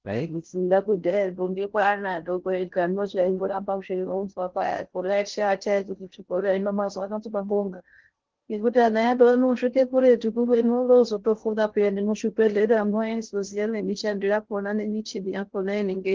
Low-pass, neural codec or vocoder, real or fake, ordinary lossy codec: 7.2 kHz; codec, 16 kHz in and 24 kHz out, 0.6 kbps, FocalCodec, streaming, 4096 codes; fake; Opus, 16 kbps